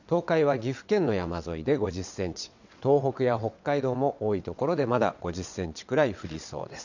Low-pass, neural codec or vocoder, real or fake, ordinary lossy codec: 7.2 kHz; vocoder, 22.05 kHz, 80 mel bands, WaveNeXt; fake; none